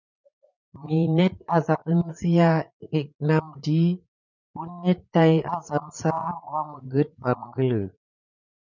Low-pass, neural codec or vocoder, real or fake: 7.2 kHz; vocoder, 44.1 kHz, 80 mel bands, Vocos; fake